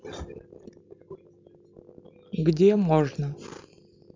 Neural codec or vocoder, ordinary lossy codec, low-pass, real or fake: none; MP3, 64 kbps; 7.2 kHz; real